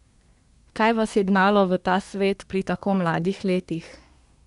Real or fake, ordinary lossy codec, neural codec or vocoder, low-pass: fake; none; codec, 24 kHz, 1 kbps, SNAC; 10.8 kHz